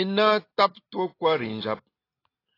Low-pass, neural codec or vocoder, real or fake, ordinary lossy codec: 5.4 kHz; none; real; AAC, 24 kbps